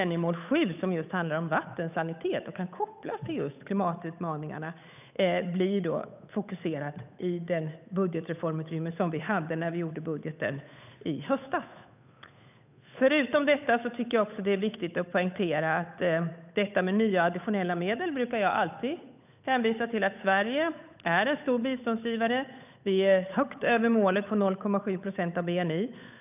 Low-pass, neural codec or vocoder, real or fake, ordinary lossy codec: 3.6 kHz; codec, 16 kHz, 8 kbps, FunCodec, trained on Chinese and English, 25 frames a second; fake; none